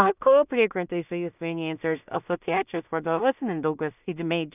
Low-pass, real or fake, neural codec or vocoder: 3.6 kHz; fake; codec, 16 kHz in and 24 kHz out, 0.4 kbps, LongCat-Audio-Codec, two codebook decoder